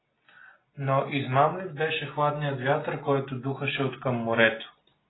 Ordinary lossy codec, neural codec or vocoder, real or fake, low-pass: AAC, 16 kbps; none; real; 7.2 kHz